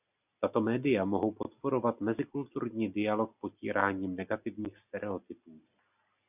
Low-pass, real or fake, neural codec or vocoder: 3.6 kHz; real; none